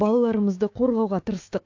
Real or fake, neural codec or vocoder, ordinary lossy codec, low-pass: fake; codec, 16 kHz, 6 kbps, DAC; AAC, 48 kbps; 7.2 kHz